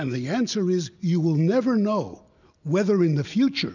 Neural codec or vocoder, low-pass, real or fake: none; 7.2 kHz; real